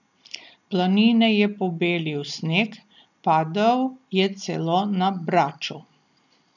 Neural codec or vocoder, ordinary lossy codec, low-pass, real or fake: none; none; 7.2 kHz; real